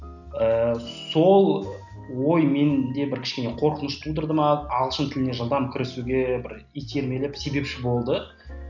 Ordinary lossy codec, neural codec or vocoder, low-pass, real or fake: none; none; 7.2 kHz; real